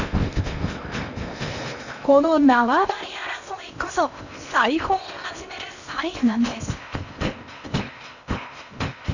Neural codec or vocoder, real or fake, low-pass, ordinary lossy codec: codec, 16 kHz in and 24 kHz out, 0.8 kbps, FocalCodec, streaming, 65536 codes; fake; 7.2 kHz; none